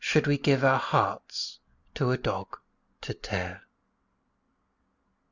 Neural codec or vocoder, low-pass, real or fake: none; 7.2 kHz; real